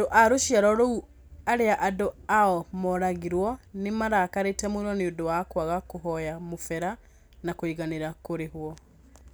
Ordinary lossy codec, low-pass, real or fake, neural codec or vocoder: none; none; real; none